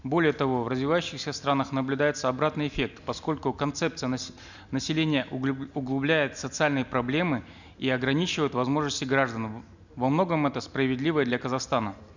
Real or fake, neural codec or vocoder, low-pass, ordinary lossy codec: real; none; 7.2 kHz; none